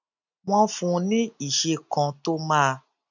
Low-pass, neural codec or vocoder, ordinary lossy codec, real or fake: 7.2 kHz; none; none; real